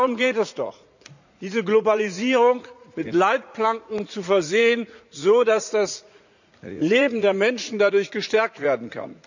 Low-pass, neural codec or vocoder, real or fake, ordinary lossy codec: 7.2 kHz; vocoder, 44.1 kHz, 80 mel bands, Vocos; fake; none